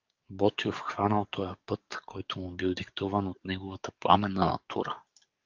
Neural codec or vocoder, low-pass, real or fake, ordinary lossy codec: codec, 44.1 kHz, 7.8 kbps, DAC; 7.2 kHz; fake; Opus, 32 kbps